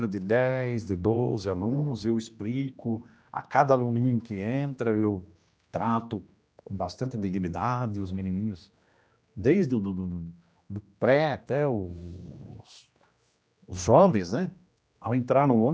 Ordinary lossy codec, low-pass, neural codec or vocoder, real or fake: none; none; codec, 16 kHz, 1 kbps, X-Codec, HuBERT features, trained on general audio; fake